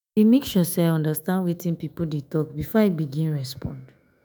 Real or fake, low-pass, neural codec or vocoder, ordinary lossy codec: fake; none; autoencoder, 48 kHz, 128 numbers a frame, DAC-VAE, trained on Japanese speech; none